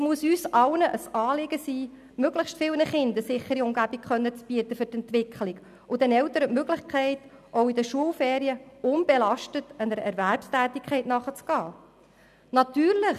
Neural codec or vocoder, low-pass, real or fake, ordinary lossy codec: none; 14.4 kHz; real; none